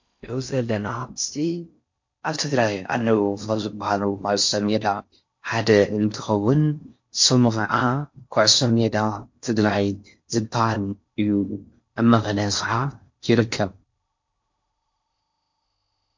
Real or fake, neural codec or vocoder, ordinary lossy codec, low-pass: fake; codec, 16 kHz in and 24 kHz out, 0.6 kbps, FocalCodec, streaming, 4096 codes; MP3, 48 kbps; 7.2 kHz